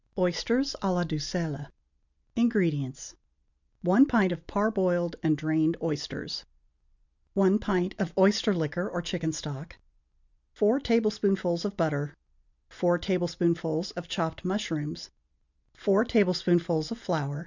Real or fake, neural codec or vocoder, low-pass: real; none; 7.2 kHz